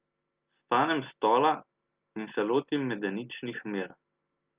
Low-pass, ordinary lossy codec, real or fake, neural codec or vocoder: 3.6 kHz; Opus, 32 kbps; real; none